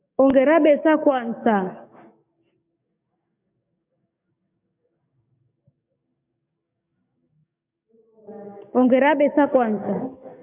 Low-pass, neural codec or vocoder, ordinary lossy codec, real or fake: 3.6 kHz; codec, 44.1 kHz, 7.8 kbps, DAC; MP3, 32 kbps; fake